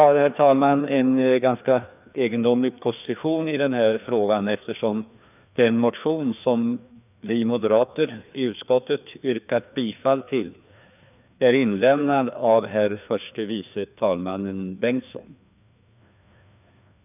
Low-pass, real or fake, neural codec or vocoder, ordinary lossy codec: 3.6 kHz; fake; codec, 16 kHz, 2 kbps, FreqCodec, larger model; none